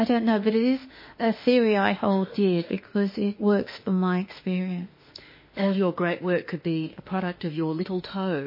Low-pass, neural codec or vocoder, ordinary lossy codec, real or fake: 5.4 kHz; autoencoder, 48 kHz, 32 numbers a frame, DAC-VAE, trained on Japanese speech; MP3, 24 kbps; fake